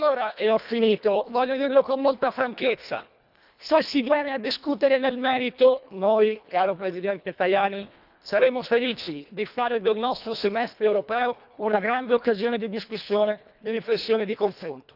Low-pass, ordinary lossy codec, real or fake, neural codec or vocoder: 5.4 kHz; none; fake; codec, 24 kHz, 1.5 kbps, HILCodec